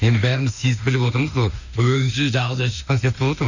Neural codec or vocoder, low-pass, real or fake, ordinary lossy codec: autoencoder, 48 kHz, 32 numbers a frame, DAC-VAE, trained on Japanese speech; 7.2 kHz; fake; none